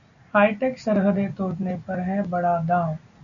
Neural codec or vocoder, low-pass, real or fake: none; 7.2 kHz; real